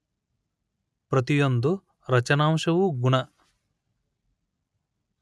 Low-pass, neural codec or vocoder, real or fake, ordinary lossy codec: none; none; real; none